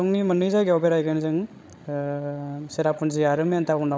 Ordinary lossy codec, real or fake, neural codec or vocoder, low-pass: none; fake; codec, 16 kHz, 16 kbps, FreqCodec, larger model; none